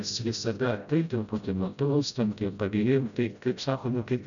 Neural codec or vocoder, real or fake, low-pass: codec, 16 kHz, 0.5 kbps, FreqCodec, smaller model; fake; 7.2 kHz